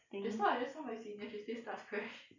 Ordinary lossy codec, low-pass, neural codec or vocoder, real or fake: none; 7.2 kHz; none; real